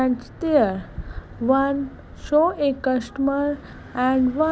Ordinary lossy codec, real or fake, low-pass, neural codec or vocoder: none; real; none; none